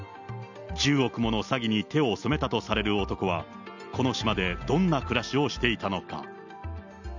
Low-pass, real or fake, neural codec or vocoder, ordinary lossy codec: 7.2 kHz; real; none; none